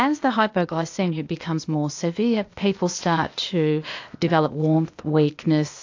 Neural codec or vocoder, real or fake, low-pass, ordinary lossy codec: codec, 16 kHz, 0.8 kbps, ZipCodec; fake; 7.2 kHz; AAC, 48 kbps